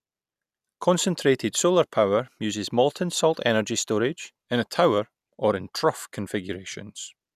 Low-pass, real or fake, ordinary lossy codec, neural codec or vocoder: 14.4 kHz; real; none; none